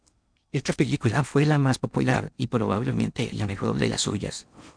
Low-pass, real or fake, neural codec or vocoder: 9.9 kHz; fake; codec, 16 kHz in and 24 kHz out, 0.8 kbps, FocalCodec, streaming, 65536 codes